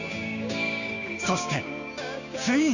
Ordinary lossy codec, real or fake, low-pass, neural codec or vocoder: none; fake; 7.2 kHz; codec, 16 kHz, 6 kbps, DAC